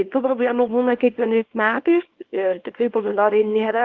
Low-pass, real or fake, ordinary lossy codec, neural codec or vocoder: 7.2 kHz; fake; Opus, 16 kbps; codec, 24 kHz, 0.9 kbps, WavTokenizer, small release